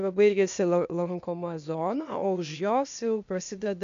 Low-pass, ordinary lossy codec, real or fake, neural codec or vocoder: 7.2 kHz; MP3, 64 kbps; fake; codec, 16 kHz, 0.8 kbps, ZipCodec